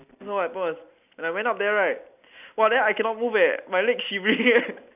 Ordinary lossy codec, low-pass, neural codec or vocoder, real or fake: none; 3.6 kHz; none; real